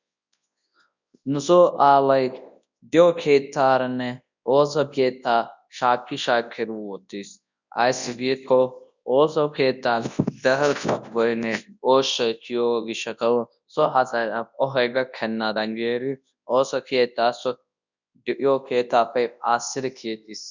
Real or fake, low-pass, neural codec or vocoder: fake; 7.2 kHz; codec, 24 kHz, 0.9 kbps, WavTokenizer, large speech release